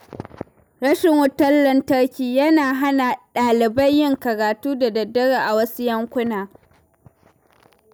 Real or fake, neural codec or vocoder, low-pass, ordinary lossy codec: real; none; none; none